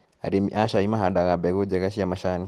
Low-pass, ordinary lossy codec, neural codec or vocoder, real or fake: 14.4 kHz; Opus, 16 kbps; none; real